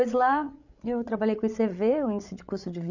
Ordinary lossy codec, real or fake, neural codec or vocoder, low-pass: none; fake; codec, 16 kHz, 8 kbps, FreqCodec, larger model; 7.2 kHz